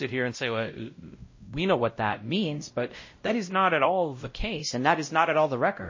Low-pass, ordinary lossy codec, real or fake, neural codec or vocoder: 7.2 kHz; MP3, 32 kbps; fake; codec, 16 kHz, 0.5 kbps, X-Codec, WavLM features, trained on Multilingual LibriSpeech